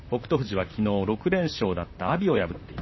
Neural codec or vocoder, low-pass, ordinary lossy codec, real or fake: vocoder, 44.1 kHz, 128 mel bands, Pupu-Vocoder; 7.2 kHz; MP3, 24 kbps; fake